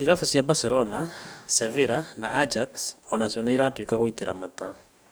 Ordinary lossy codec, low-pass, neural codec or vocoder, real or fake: none; none; codec, 44.1 kHz, 2.6 kbps, DAC; fake